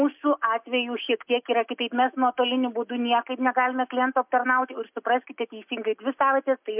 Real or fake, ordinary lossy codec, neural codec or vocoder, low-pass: real; MP3, 32 kbps; none; 3.6 kHz